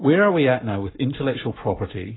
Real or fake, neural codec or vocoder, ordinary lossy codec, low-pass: fake; codec, 44.1 kHz, 7.8 kbps, Pupu-Codec; AAC, 16 kbps; 7.2 kHz